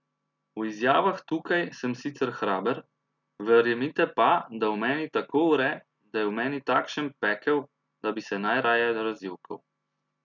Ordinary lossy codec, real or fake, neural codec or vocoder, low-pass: none; real; none; 7.2 kHz